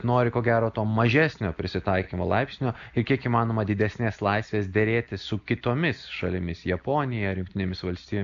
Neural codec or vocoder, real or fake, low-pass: none; real; 7.2 kHz